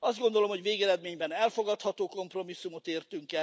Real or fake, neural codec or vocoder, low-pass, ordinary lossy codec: real; none; none; none